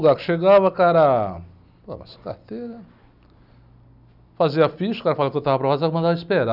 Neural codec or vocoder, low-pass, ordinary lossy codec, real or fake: none; 5.4 kHz; none; real